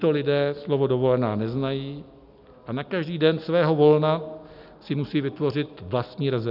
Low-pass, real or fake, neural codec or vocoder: 5.4 kHz; real; none